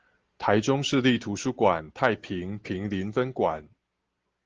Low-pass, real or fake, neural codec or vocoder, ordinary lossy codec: 7.2 kHz; real; none; Opus, 16 kbps